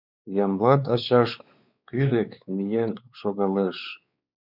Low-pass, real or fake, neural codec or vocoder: 5.4 kHz; fake; codec, 16 kHz, 4 kbps, X-Codec, WavLM features, trained on Multilingual LibriSpeech